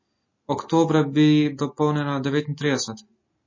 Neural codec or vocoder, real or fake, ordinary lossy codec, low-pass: none; real; MP3, 32 kbps; 7.2 kHz